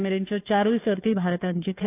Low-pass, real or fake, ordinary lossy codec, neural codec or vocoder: 3.6 kHz; fake; AAC, 24 kbps; codec, 16 kHz, 2 kbps, FunCodec, trained on Chinese and English, 25 frames a second